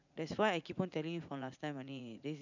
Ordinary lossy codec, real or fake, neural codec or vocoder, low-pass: none; fake; vocoder, 44.1 kHz, 80 mel bands, Vocos; 7.2 kHz